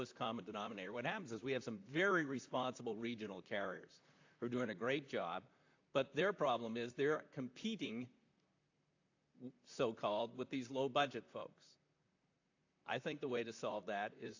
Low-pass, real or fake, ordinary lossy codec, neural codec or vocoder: 7.2 kHz; fake; AAC, 48 kbps; vocoder, 44.1 kHz, 128 mel bands, Pupu-Vocoder